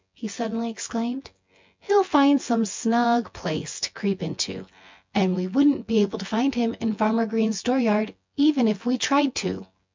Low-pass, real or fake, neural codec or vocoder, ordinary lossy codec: 7.2 kHz; fake; vocoder, 24 kHz, 100 mel bands, Vocos; MP3, 64 kbps